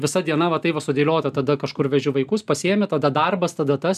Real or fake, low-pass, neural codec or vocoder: real; 14.4 kHz; none